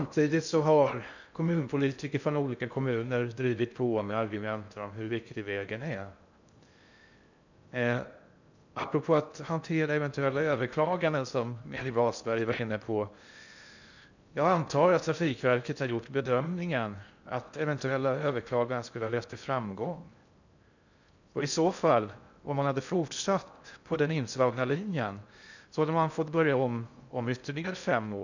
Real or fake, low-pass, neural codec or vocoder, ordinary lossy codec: fake; 7.2 kHz; codec, 16 kHz in and 24 kHz out, 0.6 kbps, FocalCodec, streaming, 2048 codes; none